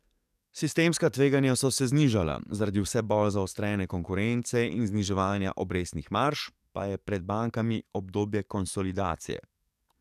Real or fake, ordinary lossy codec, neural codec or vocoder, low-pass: fake; none; codec, 44.1 kHz, 7.8 kbps, DAC; 14.4 kHz